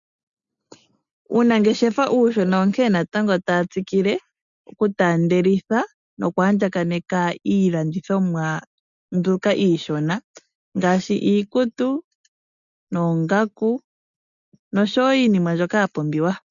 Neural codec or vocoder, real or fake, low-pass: none; real; 7.2 kHz